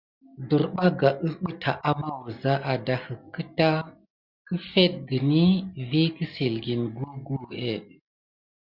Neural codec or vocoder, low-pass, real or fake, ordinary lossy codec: none; 5.4 kHz; real; Opus, 64 kbps